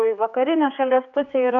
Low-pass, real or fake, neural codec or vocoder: 7.2 kHz; fake; codec, 16 kHz, 4 kbps, X-Codec, HuBERT features, trained on general audio